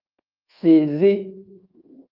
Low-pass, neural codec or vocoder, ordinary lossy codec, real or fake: 5.4 kHz; codec, 24 kHz, 1.2 kbps, DualCodec; Opus, 32 kbps; fake